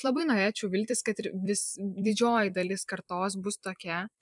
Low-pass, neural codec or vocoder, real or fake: 10.8 kHz; vocoder, 44.1 kHz, 128 mel bands every 256 samples, BigVGAN v2; fake